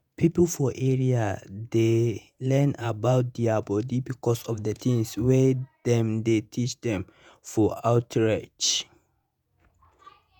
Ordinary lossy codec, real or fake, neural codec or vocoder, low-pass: none; fake; vocoder, 48 kHz, 128 mel bands, Vocos; none